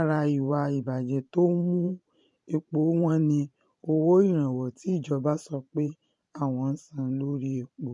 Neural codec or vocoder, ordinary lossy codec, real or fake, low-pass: vocoder, 44.1 kHz, 128 mel bands every 512 samples, BigVGAN v2; MP3, 48 kbps; fake; 10.8 kHz